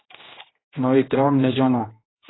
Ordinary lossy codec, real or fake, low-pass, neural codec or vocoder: AAC, 16 kbps; fake; 7.2 kHz; codec, 16 kHz, 1 kbps, X-Codec, HuBERT features, trained on general audio